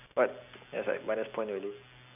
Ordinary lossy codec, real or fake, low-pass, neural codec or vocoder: none; real; 3.6 kHz; none